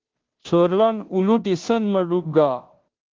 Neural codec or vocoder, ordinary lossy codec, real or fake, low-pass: codec, 16 kHz, 0.5 kbps, FunCodec, trained on Chinese and English, 25 frames a second; Opus, 16 kbps; fake; 7.2 kHz